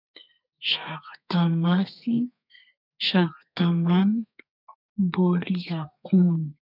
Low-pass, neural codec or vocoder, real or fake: 5.4 kHz; codec, 32 kHz, 1.9 kbps, SNAC; fake